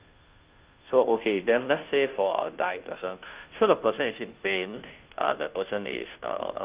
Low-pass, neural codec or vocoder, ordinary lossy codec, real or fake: 3.6 kHz; codec, 16 kHz, 0.5 kbps, FunCodec, trained on Chinese and English, 25 frames a second; Opus, 24 kbps; fake